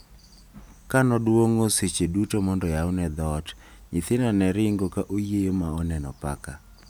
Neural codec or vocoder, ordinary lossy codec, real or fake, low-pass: none; none; real; none